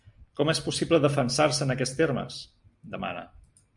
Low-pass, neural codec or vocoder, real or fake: 10.8 kHz; none; real